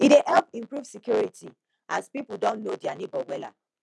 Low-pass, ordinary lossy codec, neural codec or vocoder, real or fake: none; none; none; real